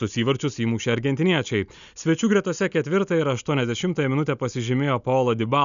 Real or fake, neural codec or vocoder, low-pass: real; none; 7.2 kHz